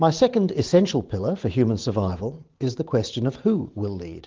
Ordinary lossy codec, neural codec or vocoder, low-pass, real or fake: Opus, 16 kbps; vocoder, 44.1 kHz, 128 mel bands every 512 samples, BigVGAN v2; 7.2 kHz; fake